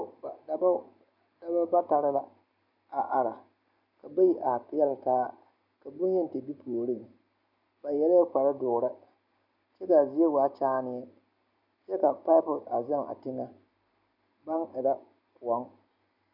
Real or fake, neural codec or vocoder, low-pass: real; none; 5.4 kHz